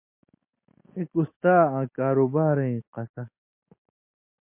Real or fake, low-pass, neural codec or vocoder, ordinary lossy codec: real; 3.6 kHz; none; MP3, 24 kbps